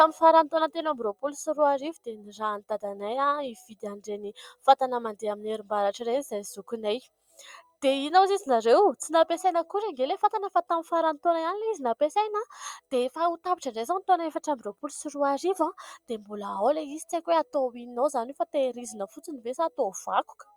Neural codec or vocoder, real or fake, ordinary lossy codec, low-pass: none; real; Opus, 64 kbps; 19.8 kHz